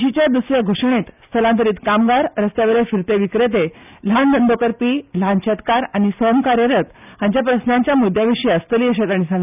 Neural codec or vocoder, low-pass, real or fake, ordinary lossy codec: none; 3.6 kHz; real; none